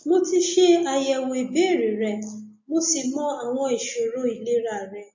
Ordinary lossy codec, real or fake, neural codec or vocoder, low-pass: MP3, 32 kbps; real; none; 7.2 kHz